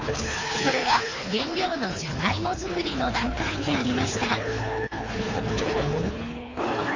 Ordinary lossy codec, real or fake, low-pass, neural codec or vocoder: MP3, 32 kbps; fake; 7.2 kHz; codec, 24 kHz, 3 kbps, HILCodec